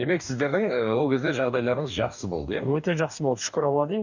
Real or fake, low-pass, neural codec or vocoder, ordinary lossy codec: fake; 7.2 kHz; codec, 44.1 kHz, 2.6 kbps, DAC; none